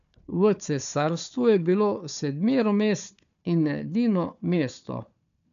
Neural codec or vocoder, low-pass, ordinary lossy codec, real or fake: codec, 16 kHz, 4 kbps, FunCodec, trained on Chinese and English, 50 frames a second; 7.2 kHz; none; fake